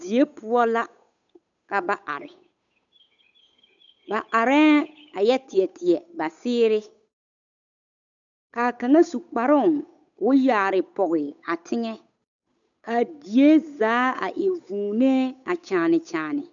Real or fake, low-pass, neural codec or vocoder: fake; 7.2 kHz; codec, 16 kHz, 8 kbps, FunCodec, trained on Chinese and English, 25 frames a second